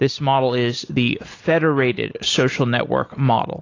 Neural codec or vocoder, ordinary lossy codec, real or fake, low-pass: none; AAC, 32 kbps; real; 7.2 kHz